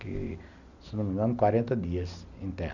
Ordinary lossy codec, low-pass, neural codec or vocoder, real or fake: none; 7.2 kHz; none; real